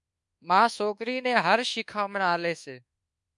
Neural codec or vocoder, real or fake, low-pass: codec, 24 kHz, 1.2 kbps, DualCodec; fake; 10.8 kHz